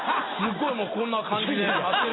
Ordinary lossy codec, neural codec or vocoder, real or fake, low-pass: AAC, 16 kbps; none; real; 7.2 kHz